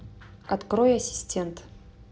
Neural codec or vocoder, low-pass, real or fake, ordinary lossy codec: none; none; real; none